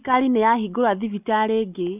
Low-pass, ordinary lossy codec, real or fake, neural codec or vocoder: 3.6 kHz; none; real; none